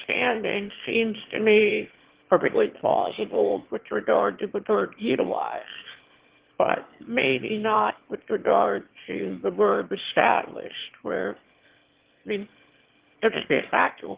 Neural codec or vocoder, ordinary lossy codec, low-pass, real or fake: autoencoder, 22.05 kHz, a latent of 192 numbers a frame, VITS, trained on one speaker; Opus, 16 kbps; 3.6 kHz; fake